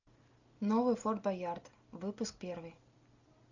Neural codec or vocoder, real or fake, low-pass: none; real; 7.2 kHz